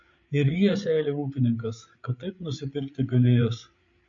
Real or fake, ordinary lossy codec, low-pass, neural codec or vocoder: fake; MP3, 48 kbps; 7.2 kHz; codec, 16 kHz, 8 kbps, FreqCodec, larger model